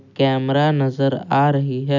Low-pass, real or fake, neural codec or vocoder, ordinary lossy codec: 7.2 kHz; real; none; none